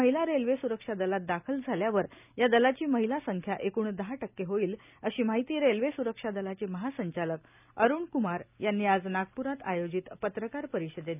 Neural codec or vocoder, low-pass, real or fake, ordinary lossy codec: none; 3.6 kHz; real; none